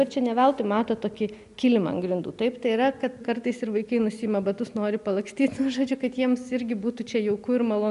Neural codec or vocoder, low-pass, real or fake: none; 10.8 kHz; real